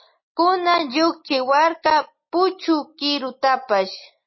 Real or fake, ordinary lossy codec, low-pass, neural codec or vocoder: real; MP3, 24 kbps; 7.2 kHz; none